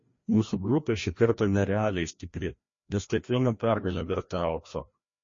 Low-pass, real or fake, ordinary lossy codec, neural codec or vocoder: 7.2 kHz; fake; MP3, 32 kbps; codec, 16 kHz, 1 kbps, FreqCodec, larger model